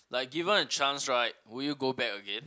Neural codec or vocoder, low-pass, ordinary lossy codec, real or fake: none; none; none; real